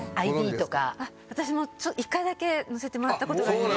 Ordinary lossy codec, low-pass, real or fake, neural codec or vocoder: none; none; real; none